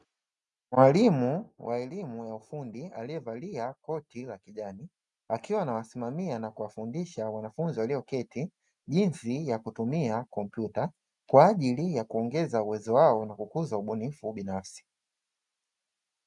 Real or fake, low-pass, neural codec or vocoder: real; 10.8 kHz; none